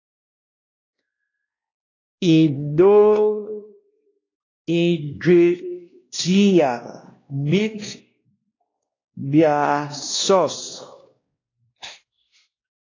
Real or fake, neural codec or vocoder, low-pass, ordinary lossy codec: fake; codec, 16 kHz, 1 kbps, X-Codec, WavLM features, trained on Multilingual LibriSpeech; 7.2 kHz; AAC, 32 kbps